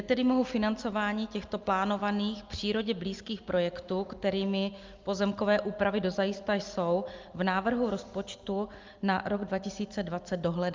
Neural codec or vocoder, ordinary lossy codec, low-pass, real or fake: none; Opus, 24 kbps; 7.2 kHz; real